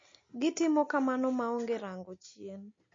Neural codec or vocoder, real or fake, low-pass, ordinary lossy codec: none; real; 7.2 kHz; MP3, 32 kbps